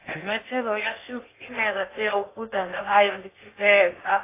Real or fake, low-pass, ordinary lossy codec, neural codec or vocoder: fake; 3.6 kHz; AAC, 24 kbps; codec, 16 kHz in and 24 kHz out, 0.6 kbps, FocalCodec, streaming, 2048 codes